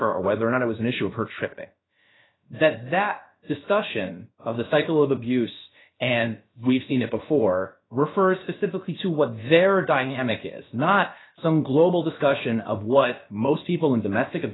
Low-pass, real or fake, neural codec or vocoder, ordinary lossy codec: 7.2 kHz; fake; codec, 16 kHz, about 1 kbps, DyCAST, with the encoder's durations; AAC, 16 kbps